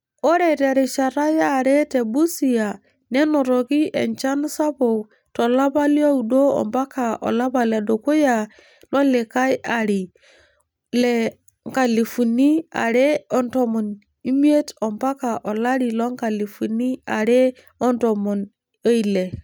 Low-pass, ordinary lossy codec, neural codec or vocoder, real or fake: none; none; none; real